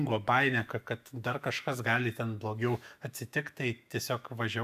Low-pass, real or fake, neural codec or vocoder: 14.4 kHz; fake; autoencoder, 48 kHz, 128 numbers a frame, DAC-VAE, trained on Japanese speech